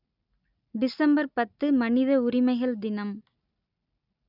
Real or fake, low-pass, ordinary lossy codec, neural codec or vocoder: real; 5.4 kHz; none; none